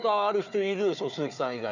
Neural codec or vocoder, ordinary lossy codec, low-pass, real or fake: codec, 16 kHz, 4 kbps, FunCodec, trained on Chinese and English, 50 frames a second; none; 7.2 kHz; fake